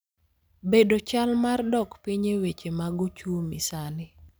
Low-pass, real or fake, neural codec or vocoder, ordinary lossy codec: none; real; none; none